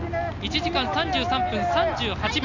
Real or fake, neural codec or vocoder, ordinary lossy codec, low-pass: real; none; none; 7.2 kHz